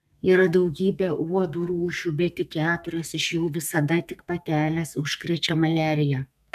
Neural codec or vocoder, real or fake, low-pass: codec, 32 kHz, 1.9 kbps, SNAC; fake; 14.4 kHz